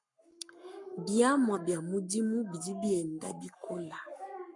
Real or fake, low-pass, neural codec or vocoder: fake; 10.8 kHz; codec, 44.1 kHz, 7.8 kbps, Pupu-Codec